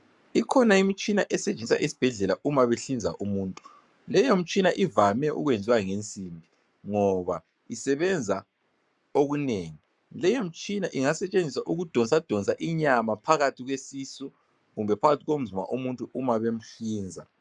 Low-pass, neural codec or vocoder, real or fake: 10.8 kHz; codec, 44.1 kHz, 7.8 kbps, Pupu-Codec; fake